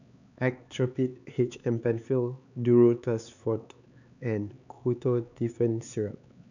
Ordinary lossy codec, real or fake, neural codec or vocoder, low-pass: none; fake; codec, 16 kHz, 4 kbps, X-Codec, HuBERT features, trained on LibriSpeech; 7.2 kHz